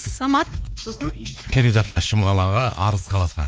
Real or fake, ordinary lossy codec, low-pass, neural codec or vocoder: fake; none; none; codec, 16 kHz, 2 kbps, X-Codec, WavLM features, trained on Multilingual LibriSpeech